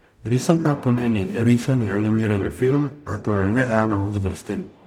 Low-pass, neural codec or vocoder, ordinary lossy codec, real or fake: 19.8 kHz; codec, 44.1 kHz, 0.9 kbps, DAC; none; fake